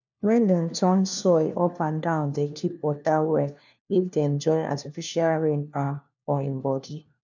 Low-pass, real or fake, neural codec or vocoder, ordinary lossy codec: 7.2 kHz; fake; codec, 16 kHz, 1 kbps, FunCodec, trained on LibriTTS, 50 frames a second; none